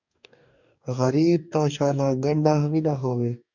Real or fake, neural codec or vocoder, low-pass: fake; codec, 44.1 kHz, 2.6 kbps, DAC; 7.2 kHz